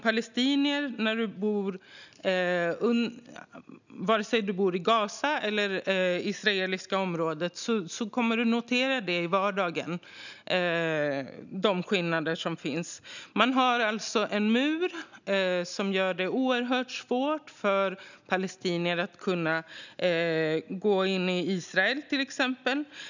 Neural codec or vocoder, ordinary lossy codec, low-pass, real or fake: none; none; 7.2 kHz; real